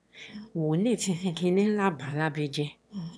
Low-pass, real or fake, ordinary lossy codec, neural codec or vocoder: none; fake; none; autoencoder, 22.05 kHz, a latent of 192 numbers a frame, VITS, trained on one speaker